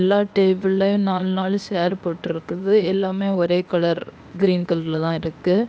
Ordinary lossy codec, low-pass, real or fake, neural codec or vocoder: none; none; fake; codec, 16 kHz, 0.8 kbps, ZipCodec